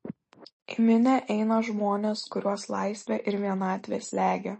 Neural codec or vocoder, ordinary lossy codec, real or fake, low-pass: none; MP3, 32 kbps; real; 10.8 kHz